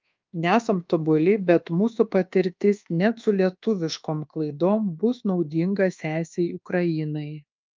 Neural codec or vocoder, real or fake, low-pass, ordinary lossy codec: codec, 24 kHz, 1.2 kbps, DualCodec; fake; 7.2 kHz; Opus, 24 kbps